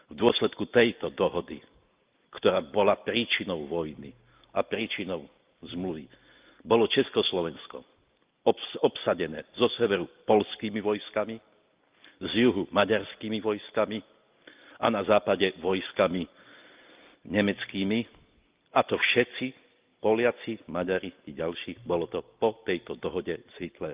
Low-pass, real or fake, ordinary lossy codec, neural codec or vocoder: 3.6 kHz; real; Opus, 32 kbps; none